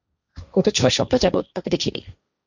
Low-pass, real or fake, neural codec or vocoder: 7.2 kHz; fake; codec, 16 kHz, 1.1 kbps, Voila-Tokenizer